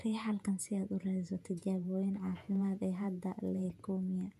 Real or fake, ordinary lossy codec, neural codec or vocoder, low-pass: fake; none; vocoder, 48 kHz, 128 mel bands, Vocos; 14.4 kHz